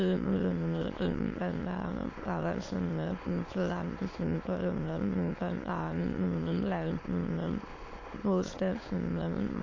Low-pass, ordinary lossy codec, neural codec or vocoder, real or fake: 7.2 kHz; AAC, 32 kbps; autoencoder, 22.05 kHz, a latent of 192 numbers a frame, VITS, trained on many speakers; fake